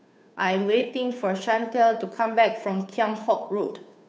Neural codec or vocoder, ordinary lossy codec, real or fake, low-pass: codec, 16 kHz, 2 kbps, FunCodec, trained on Chinese and English, 25 frames a second; none; fake; none